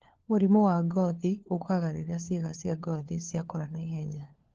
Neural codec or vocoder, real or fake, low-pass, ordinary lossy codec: codec, 16 kHz, 4 kbps, FunCodec, trained on LibriTTS, 50 frames a second; fake; 7.2 kHz; Opus, 16 kbps